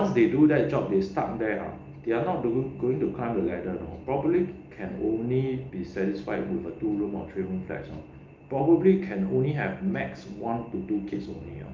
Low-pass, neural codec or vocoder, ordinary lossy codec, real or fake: 7.2 kHz; none; Opus, 24 kbps; real